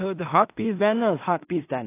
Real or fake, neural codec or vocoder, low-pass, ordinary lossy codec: fake; codec, 16 kHz in and 24 kHz out, 0.4 kbps, LongCat-Audio-Codec, two codebook decoder; 3.6 kHz; AAC, 24 kbps